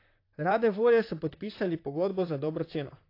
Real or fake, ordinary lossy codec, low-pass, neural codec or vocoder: fake; AAC, 32 kbps; 5.4 kHz; vocoder, 44.1 kHz, 128 mel bands, Pupu-Vocoder